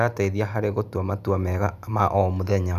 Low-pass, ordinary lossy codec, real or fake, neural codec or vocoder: 14.4 kHz; none; real; none